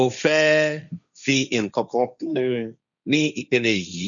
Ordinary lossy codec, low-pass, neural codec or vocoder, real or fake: none; 7.2 kHz; codec, 16 kHz, 1.1 kbps, Voila-Tokenizer; fake